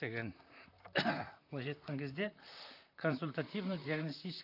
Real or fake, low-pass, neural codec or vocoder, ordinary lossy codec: fake; 5.4 kHz; vocoder, 44.1 kHz, 128 mel bands, Pupu-Vocoder; none